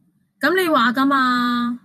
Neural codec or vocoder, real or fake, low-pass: vocoder, 44.1 kHz, 128 mel bands every 512 samples, BigVGAN v2; fake; 14.4 kHz